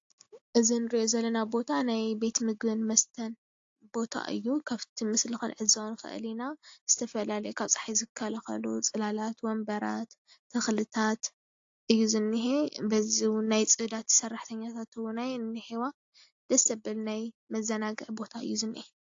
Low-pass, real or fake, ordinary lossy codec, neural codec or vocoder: 7.2 kHz; real; AAC, 48 kbps; none